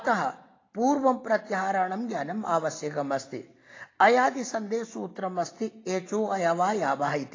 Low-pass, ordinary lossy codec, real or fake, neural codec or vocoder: 7.2 kHz; AAC, 32 kbps; real; none